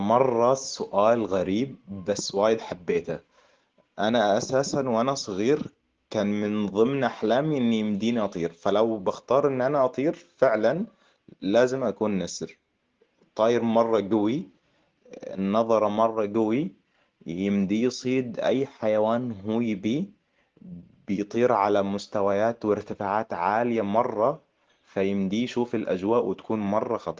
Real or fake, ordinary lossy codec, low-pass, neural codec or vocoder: real; Opus, 16 kbps; 7.2 kHz; none